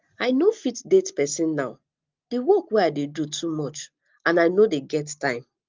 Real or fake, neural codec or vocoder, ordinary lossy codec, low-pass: real; none; Opus, 24 kbps; 7.2 kHz